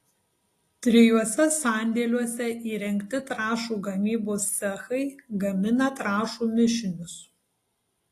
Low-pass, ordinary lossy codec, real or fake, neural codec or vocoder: 14.4 kHz; AAC, 48 kbps; real; none